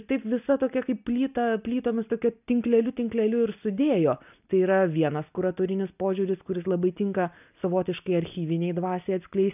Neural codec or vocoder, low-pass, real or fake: none; 3.6 kHz; real